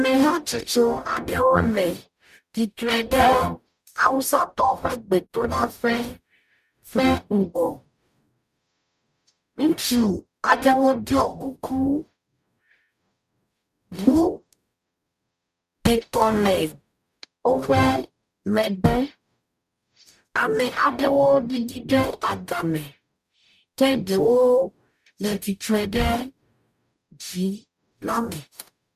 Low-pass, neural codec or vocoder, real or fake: 14.4 kHz; codec, 44.1 kHz, 0.9 kbps, DAC; fake